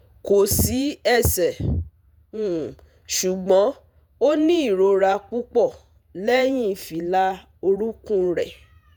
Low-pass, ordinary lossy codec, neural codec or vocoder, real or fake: none; none; vocoder, 48 kHz, 128 mel bands, Vocos; fake